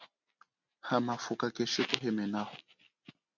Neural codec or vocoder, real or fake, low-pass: none; real; 7.2 kHz